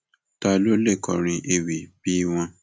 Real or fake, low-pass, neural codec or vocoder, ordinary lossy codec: real; none; none; none